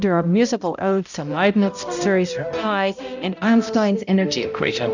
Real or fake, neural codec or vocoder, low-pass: fake; codec, 16 kHz, 0.5 kbps, X-Codec, HuBERT features, trained on balanced general audio; 7.2 kHz